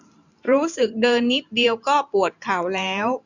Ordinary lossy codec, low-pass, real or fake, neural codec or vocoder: none; 7.2 kHz; fake; vocoder, 44.1 kHz, 128 mel bands every 512 samples, BigVGAN v2